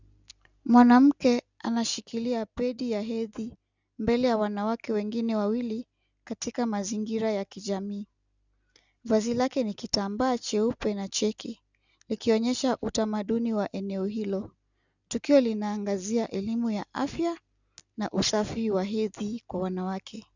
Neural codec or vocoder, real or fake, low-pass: none; real; 7.2 kHz